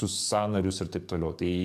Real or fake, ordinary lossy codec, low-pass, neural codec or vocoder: fake; MP3, 96 kbps; 14.4 kHz; codec, 44.1 kHz, 7.8 kbps, DAC